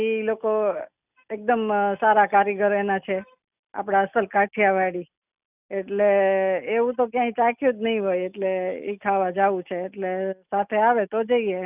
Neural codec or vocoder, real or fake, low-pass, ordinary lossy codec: none; real; 3.6 kHz; none